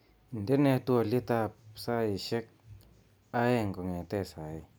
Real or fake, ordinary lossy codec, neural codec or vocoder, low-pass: real; none; none; none